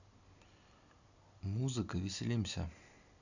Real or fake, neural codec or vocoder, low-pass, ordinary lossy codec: real; none; 7.2 kHz; none